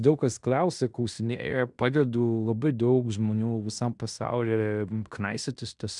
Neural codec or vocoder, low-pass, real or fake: codec, 16 kHz in and 24 kHz out, 0.9 kbps, LongCat-Audio-Codec, fine tuned four codebook decoder; 10.8 kHz; fake